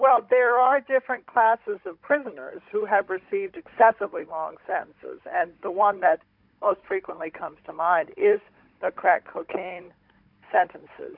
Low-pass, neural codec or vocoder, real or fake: 5.4 kHz; codec, 16 kHz, 16 kbps, FunCodec, trained on Chinese and English, 50 frames a second; fake